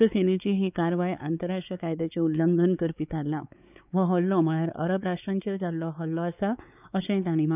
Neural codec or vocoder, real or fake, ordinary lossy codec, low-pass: codec, 16 kHz, 4 kbps, X-Codec, WavLM features, trained on Multilingual LibriSpeech; fake; none; 3.6 kHz